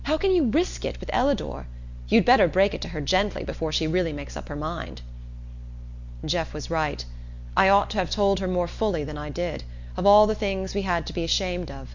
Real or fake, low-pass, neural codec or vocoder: real; 7.2 kHz; none